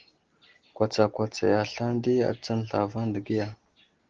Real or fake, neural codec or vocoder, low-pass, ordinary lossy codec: real; none; 7.2 kHz; Opus, 16 kbps